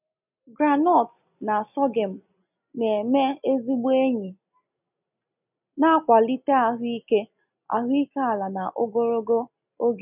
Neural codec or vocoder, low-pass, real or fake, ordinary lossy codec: none; 3.6 kHz; real; none